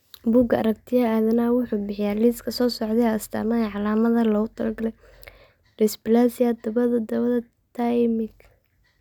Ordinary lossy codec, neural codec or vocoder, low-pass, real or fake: none; none; 19.8 kHz; real